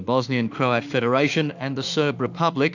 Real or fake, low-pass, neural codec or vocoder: fake; 7.2 kHz; autoencoder, 48 kHz, 32 numbers a frame, DAC-VAE, trained on Japanese speech